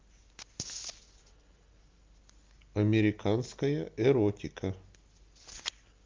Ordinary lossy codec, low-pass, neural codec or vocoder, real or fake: Opus, 24 kbps; 7.2 kHz; none; real